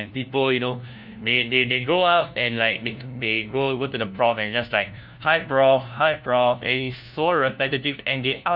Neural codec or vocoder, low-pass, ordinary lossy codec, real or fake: codec, 16 kHz, 1 kbps, FunCodec, trained on LibriTTS, 50 frames a second; 5.4 kHz; none; fake